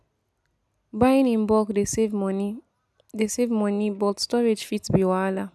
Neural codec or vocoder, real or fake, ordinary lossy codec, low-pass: none; real; none; none